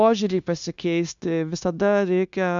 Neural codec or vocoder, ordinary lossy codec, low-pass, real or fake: codec, 16 kHz, 0.9 kbps, LongCat-Audio-Codec; MP3, 96 kbps; 7.2 kHz; fake